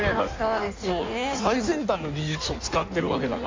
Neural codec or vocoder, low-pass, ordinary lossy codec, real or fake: codec, 16 kHz in and 24 kHz out, 1.1 kbps, FireRedTTS-2 codec; 7.2 kHz; MP3, 48 kbps; fake